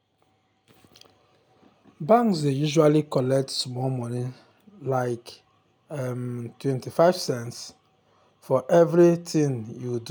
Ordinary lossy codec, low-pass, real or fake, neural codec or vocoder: none; none; real; none